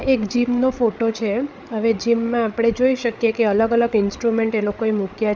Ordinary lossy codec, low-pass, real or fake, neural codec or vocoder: none; none; fake; codec, 16 kHz, 8 kbps, FreqCodec, larger model